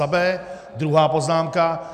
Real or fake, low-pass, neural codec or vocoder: real; 14.4 kHz; none